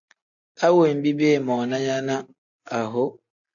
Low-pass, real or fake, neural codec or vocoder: 7.2 kHz; real; none